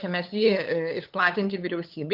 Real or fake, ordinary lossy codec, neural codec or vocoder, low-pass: fake; Opus, 24 kbps; codec, 16 kHz, 8 kbps, FunCodec, trained on LibriTTS, 25 frames a second; 5.4 kHz